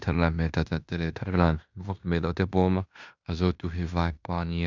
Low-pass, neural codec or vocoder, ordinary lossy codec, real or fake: 7.2 kHz; codec, 16 kHz in and 24 kHz out, 0.9 kbps, LongCat-Audio-Codec, fine tuned four codebook decoder; none; fake